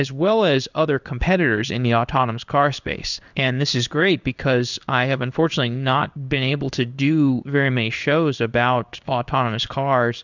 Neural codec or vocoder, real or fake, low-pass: codec, 16 kHz in and 24 kHz out, 1 kbps, XY-Tokenizer; fake; 7.2 kHz